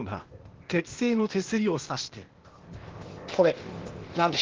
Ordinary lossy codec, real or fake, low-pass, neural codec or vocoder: Opus, 16 kbps; fake; 7.2 kHz; codec, 16 kHz, 0.8 kbps, ZipCodec